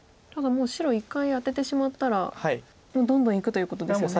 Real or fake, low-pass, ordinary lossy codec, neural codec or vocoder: real; none; none; none